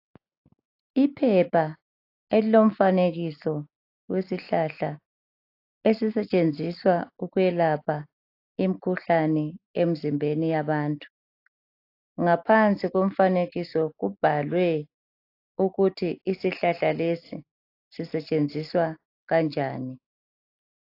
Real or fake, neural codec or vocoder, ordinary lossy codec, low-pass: real; none; AAC, 32 kbps; 5.4 kHz